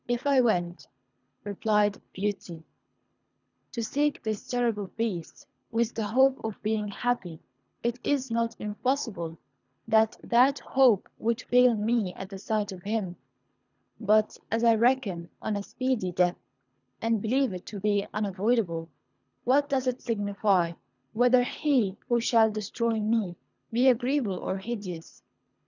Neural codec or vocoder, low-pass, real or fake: codec, 24 kHz, 3 kbps, HILCodec; 7.2 kHz; fake